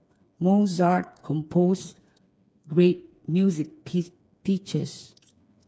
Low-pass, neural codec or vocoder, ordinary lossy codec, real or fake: none; codec, 16 kHz, 4 kbps, FreqCodec, smaller model; none; fake